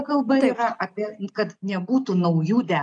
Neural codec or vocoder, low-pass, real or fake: none; 9.9 kHz; real